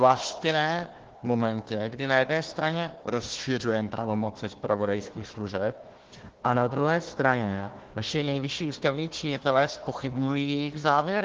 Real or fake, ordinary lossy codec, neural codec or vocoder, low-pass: fake; Opus, 16 kbps; codec, 16 kHz, 1 kbps, FunCodec, trained on Chinese and English, 50 frames a second; 7.2 kHz